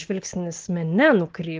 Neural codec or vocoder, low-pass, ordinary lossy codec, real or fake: none; 7.2 kHz; Opus, 16 kbps; real